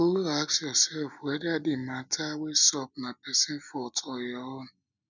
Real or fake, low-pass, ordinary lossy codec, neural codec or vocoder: real; 7.2 kHz; none; none